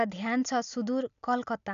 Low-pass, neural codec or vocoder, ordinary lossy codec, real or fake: 7.2 kHz; none; none; real